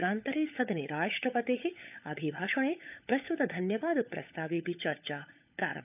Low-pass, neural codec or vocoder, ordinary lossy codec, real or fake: 3.6 kHz; codec, 16 kHz, 16 kbps, FunCodec, trained on LibriTTS, 50 frames a second; none; fake